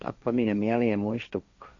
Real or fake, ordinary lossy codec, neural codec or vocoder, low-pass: fake; none; codec, 16 kHz, 1.1 kbps, Voila-Tokenizer; 7.2 kHz